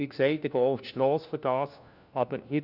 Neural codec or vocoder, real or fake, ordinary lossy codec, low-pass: codec, 16 kHz, 1 kbps, FunCodec, trained on LibriTTS, 50 frames a second; fake; none; 5.4 kHz